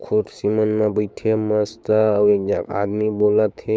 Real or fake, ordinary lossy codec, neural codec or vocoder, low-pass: fake; none; codec, 16 kHz, 6 kbps, DAC; none